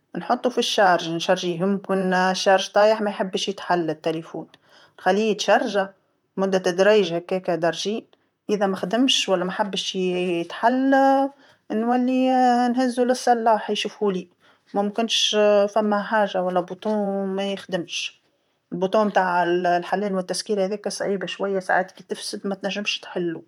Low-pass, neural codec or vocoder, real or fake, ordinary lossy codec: 19.8 kHz; vocoder, 44.1 kHz, 128 mel bands, Pupu-Vocoder; fake; MP3, 96 kbps